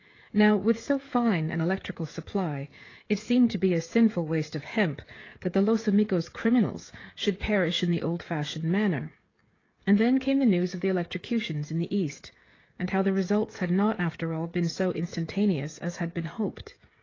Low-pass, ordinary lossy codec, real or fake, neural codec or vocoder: 7.2 kHz; AAC, 32 kbps; fake; codec, 16 kHz, 16 kbps, FreqCodec, smaller model